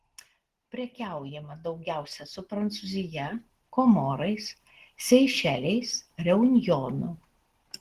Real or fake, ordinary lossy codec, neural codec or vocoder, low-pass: real; Opus, 16 kbps; none; 14.4 kHz